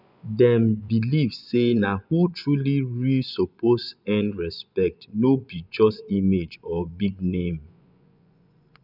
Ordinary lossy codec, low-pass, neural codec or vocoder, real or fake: none; 5.4 kHz; vocoder, 24 kHz, 100 mel bands, Vocos; fake